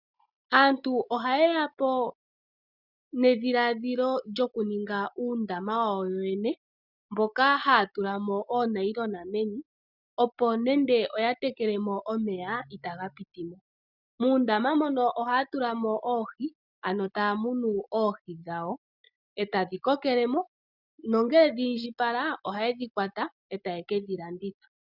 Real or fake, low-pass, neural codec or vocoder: real; 5.4 kHz; none